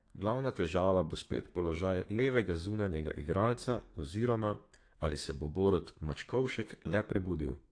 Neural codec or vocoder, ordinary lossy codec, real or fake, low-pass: codec, 32 kHz, 1.9 kbps, SNAC; AAC, 48 kbps; fake; 9.9 kHz